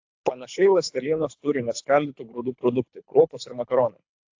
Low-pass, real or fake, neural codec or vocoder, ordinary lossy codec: 7.2 kHz; fake; codec, 24 kHz, 3 kbps, HILCodec; AAC, 48 kbps